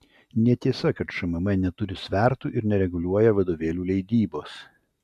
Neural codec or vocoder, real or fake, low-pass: none; real; 14.4 kHz